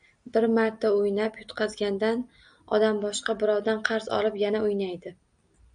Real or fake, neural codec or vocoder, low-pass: real; none; 9.9 kHz